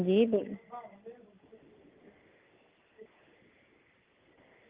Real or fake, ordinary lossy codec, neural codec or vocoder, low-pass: real; Opus, 24 kbps; none; 3.6 kHz